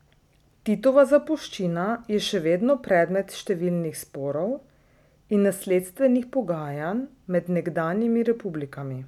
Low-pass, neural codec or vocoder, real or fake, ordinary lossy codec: 19.8 kHz; none; real; none